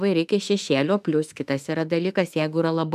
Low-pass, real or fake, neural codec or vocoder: 14.4 kHz; fake; autoencoder, 48 kHz, 32 numbers a frame, DAC-VAE, trained on Japanese speech